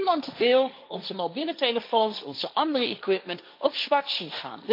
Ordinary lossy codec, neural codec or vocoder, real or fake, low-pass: MP3, 32 kbps; codec, 16 kHz, 1.1 kbps, Voila-Tokenizer; fake; 5.4 kHz